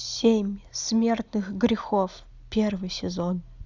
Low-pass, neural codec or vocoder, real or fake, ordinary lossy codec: 7.2 kHz; none; real; Opus, 64 kbps